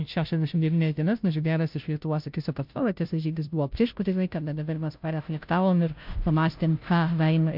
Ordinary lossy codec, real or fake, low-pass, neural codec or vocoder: MP3, 48 kbps; fake; 5.4 kHz; codec, 16 kHz, 0.5 kbps, FunCodec, trained on Chinese and English, 25 frames a second